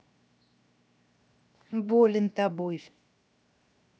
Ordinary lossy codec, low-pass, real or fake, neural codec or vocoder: none; none; fake; codec, 16 kHz, 0.7 kbps, FocalCodec